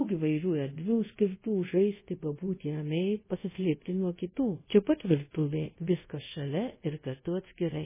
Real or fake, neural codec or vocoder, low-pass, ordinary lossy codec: fake; codec, 24 kHz, 0.5 kbps, DualCodec; 3.6 kHz; MP3, 16 kbps